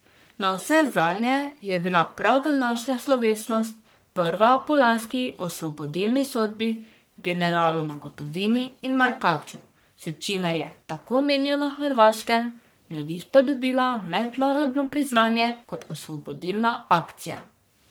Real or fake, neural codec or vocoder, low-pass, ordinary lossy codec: fake; codec, 44.1 kHz, 1.7 kbps, Pupu-Codec; none; none